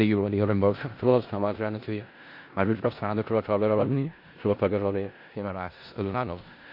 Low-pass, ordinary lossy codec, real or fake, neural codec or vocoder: 5.4 kHz; MP3, 32 kbps; fake; codec, 16 kHz in and 24 kHz out, 0.4 kbps, LongCat-Audio-Codec, four codebook decoder